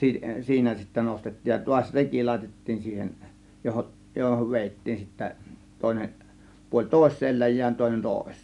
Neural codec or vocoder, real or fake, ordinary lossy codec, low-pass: none; real; none; 10.8 kHz